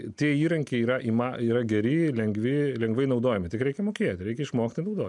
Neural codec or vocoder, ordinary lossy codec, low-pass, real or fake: none; MP3, 96 kbps; 10.8 kHz; real